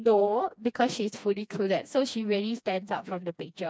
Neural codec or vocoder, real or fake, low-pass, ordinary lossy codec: codec, 16 kHz, 2 kbps, FreqCodec, smaller model; fake; none; none